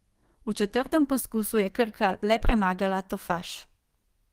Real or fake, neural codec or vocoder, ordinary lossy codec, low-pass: fake; codec, 32 kHz, 1.9 kbps, SNAC; Opus, 24 kbps; 14.4 kHz